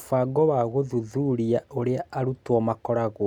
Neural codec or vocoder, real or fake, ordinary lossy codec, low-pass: none; real; none; 19.8 kHz